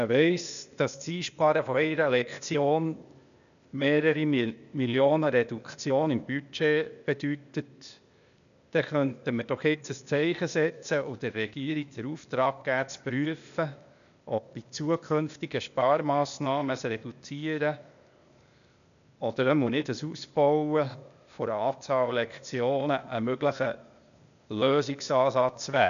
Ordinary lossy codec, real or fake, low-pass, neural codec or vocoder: none; fake; 7.2 kHz; codec, 16 kHz, 0.8 kbps, ZipCodec